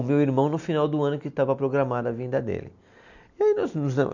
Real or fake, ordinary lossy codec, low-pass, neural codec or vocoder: real; none; 7.2 kHz; none